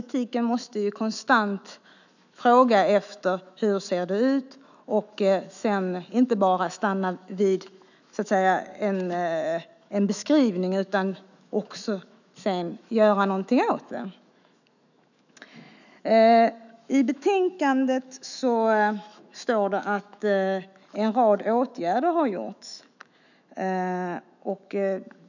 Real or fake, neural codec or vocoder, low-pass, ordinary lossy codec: fake; autoencoder, 48 kHz, 128 numbers a frame, DAC-VAE, trained on Japanese speech; 7.2 kHz; none